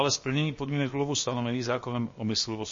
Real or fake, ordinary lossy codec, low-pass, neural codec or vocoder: fake; MP3, 32 kbps; 7.2 kHz; codec, 16 kHz, 0.7 kbps, FocalCodec